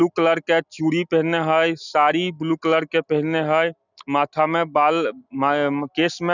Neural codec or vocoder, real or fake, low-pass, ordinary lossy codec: none; real; 7.2 kHz; none